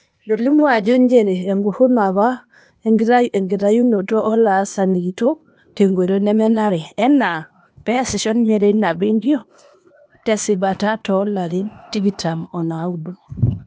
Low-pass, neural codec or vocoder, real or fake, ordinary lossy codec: none; codec, 16 kHz, 0.8 kbps, ZipCodec; fake; none